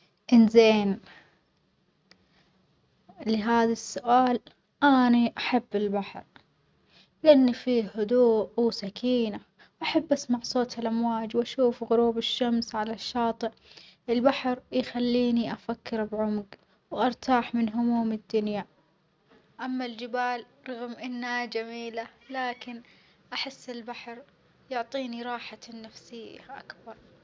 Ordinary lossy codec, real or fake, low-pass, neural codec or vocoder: none; real; none; none